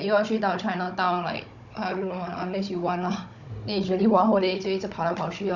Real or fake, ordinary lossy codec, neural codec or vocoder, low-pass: fake; none; codec, 16 kHz, 16 kbps, FunCodec, trained on Chinese and English, 50 frames a second; 7.2 kHz